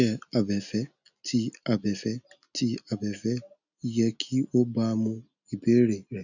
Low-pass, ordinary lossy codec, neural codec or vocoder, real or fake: 7.2 kHz; none; none; real